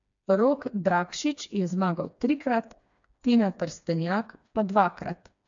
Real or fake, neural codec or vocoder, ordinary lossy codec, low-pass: fake; codec, 16 kHz, 2 kbps, FreqCodec, smaller model; MP3, 64 kbps; 7.2 kHz